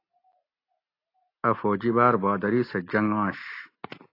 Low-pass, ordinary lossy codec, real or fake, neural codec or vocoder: 5.4 kHz; AAC, 32 kbps; real; none